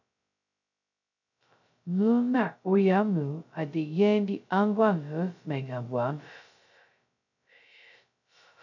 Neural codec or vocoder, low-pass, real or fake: codec, 16 kHz, 0.2 kbps, FocalCodec; 7.2 kHz; fake